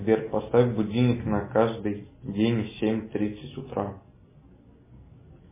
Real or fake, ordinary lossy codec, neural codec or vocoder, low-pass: real; MP3, 16 kbps; none; 3.6 kHz